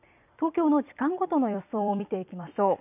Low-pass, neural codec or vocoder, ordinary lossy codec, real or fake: 3.6 kHz; vocoder, 44.1 kHz, 128 mel bands every 256 samples, BigVGAN v2; none; fake